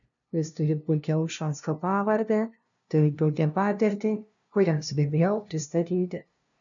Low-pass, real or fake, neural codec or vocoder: 7.2 kHz; fake; codec, 16 kHz, 0.5 kbps, FunCodec, trained on LibriTTS, 25 frames a second